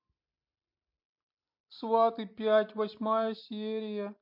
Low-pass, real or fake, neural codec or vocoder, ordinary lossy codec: 5.4 kHz; fake; vocoder, 44.1 kHz, 128 mel bands every 256 samples, BigVGAN v2; MP3, 32 kbps